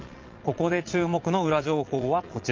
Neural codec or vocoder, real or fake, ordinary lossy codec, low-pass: vocoder, 22.05 kHz, 80 mel bands, Vocos; fake; Opus, 32 kbps; 7.2 kHz